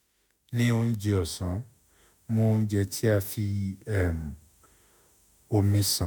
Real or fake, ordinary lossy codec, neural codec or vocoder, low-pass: fake; none; autoencoder, 48 kHz, 32 numbers a frame, DAC-VAE, trained on Japanese speech; none